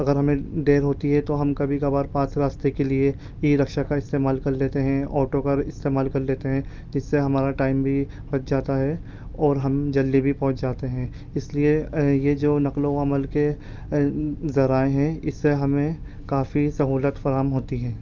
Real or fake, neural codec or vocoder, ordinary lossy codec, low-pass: real; none; Opus, 24 kbps; 7.2 kHz